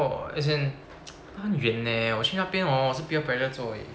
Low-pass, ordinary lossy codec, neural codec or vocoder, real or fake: none; none; none; real